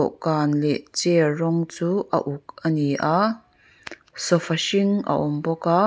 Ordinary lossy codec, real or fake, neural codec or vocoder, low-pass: none; real; none; none